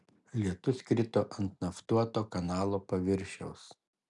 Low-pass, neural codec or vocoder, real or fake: 10.8 kHz; none; real